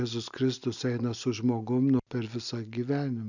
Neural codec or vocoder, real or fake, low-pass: none; real; 7.2 kHz